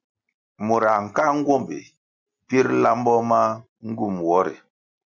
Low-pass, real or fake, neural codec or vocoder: 7.2 kHz; real; none